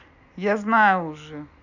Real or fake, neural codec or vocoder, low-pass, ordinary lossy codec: real; none; 7.2 kHz; none